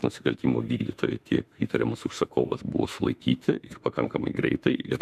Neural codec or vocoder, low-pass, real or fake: autoencoder, 48 kHz, 32 numbers a frame, DAC-VAE, trained on Japanese speech; 14.4 kHz; fake